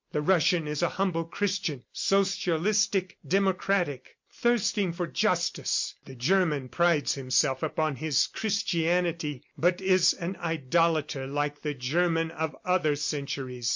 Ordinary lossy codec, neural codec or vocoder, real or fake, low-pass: MP3, 48 kbps; none; real; 7.2 kHz